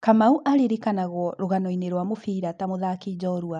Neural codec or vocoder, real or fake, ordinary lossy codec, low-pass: none; real; none; 9.9 kHz